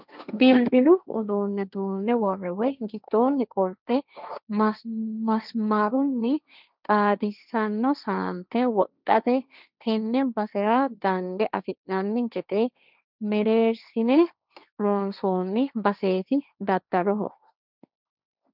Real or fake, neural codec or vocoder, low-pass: fake; codec, 16 kHz, 1.1 kbps, Voila-Tokenizer; 5.4 kHz